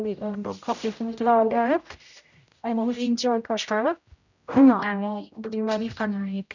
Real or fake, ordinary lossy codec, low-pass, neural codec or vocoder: fake; none; 7.2 kHz; codec, 16 kHz, 0.5 kbps, X-Codec, HuBERT features, trained on general audio